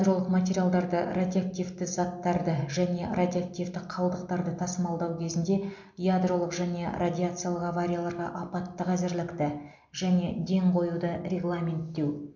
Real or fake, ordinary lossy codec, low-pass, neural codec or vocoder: real; MP3, 48 kbps; 7.2 kHz; none